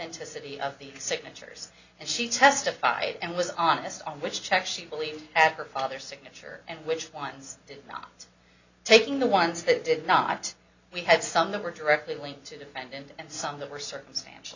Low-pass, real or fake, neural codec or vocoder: 7.2 kHz; real; none